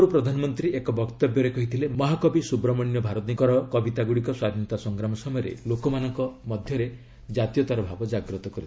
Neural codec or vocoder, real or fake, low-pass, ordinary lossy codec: none; real; none; none